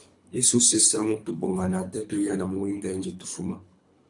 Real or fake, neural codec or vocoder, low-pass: fake; codec, 24 kHz, 3 kbps, HILCodec; 10.8 kHz